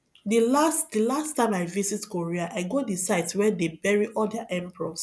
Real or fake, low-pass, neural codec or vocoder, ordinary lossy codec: real; none; none; none